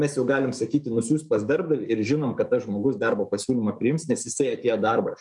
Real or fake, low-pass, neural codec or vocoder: fake; 10.8 kHz; codec, 44.1 kHz, 7.8 kbps, DAC